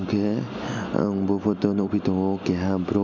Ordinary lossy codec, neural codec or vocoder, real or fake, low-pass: none; none; real; 7.2 kHz